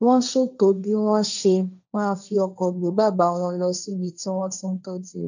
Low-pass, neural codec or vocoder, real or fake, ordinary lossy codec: 7.2 kHz; codec, 16 kHz, 1.1 kbps, Voila-Tokenizer; fake; none